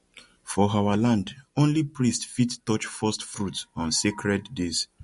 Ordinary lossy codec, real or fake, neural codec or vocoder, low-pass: MP3, 48 kbps; real; none; 14.4 kHz